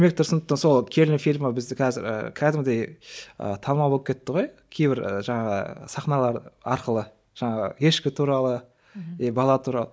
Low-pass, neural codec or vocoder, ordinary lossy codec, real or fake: none; none; none; real